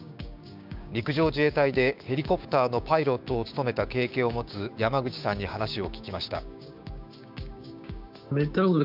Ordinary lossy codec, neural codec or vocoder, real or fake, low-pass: none; codec, 16 kHz, 6 kbps, DAC; fake; 5.4 kHz